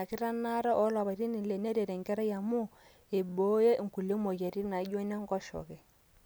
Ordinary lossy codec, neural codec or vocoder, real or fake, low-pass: none; none; real; none